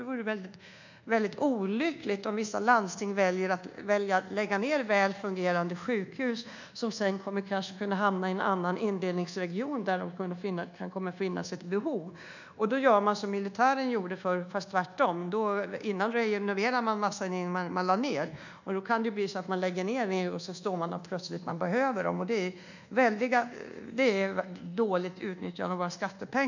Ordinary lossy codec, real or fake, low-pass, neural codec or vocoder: none; fake; 7.2 kHz; codec, 24 kHz, 1.2 kbps, DualCodec